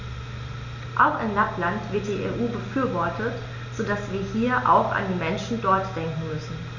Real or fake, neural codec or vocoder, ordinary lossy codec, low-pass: real; none; none; 7.2 kHz